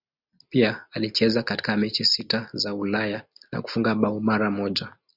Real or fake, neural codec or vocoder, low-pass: real; none; 5.4 kHz